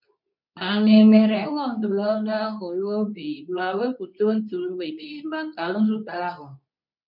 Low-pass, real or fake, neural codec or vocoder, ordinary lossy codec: 5.4 kHz; fake; codec, 24 kHz, 0.9 kbps, WavTokenizer, medium speech release version 2; none